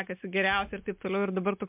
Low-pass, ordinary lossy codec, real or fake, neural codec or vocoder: 3.6 kHz; MP3, 32 kbps; real; none